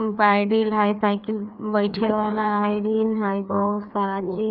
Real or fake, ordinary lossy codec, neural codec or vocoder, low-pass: fake; none; codec, 16 kHz, 2 kbps, FreqCodec, larger model; 5.4 kHz